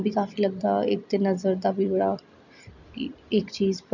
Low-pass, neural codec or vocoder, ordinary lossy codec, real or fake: 7.2 kHz; none; none; real